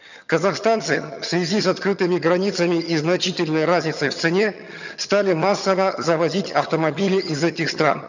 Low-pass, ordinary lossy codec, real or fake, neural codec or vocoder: 7.2 kHz; none; fake; vocoder, 22.05 kHz, 80 mel bands, HiFi-GAN